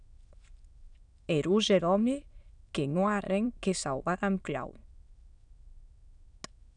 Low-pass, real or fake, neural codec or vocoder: 9.9 kHz; fake; autoencoder, 22.05 kHz, a latent of 192 numbers a frame, VITS, trained on many speakers